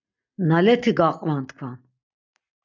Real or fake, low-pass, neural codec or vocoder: real; 7.2 kHz; none